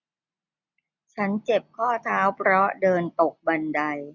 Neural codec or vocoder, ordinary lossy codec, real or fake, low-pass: none; none; real; 7.2 kHz